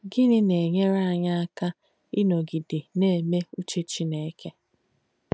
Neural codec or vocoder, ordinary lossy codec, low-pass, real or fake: none; none; none; real